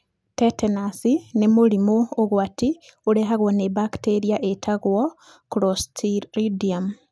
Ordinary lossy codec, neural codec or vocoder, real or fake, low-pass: none; none; real; none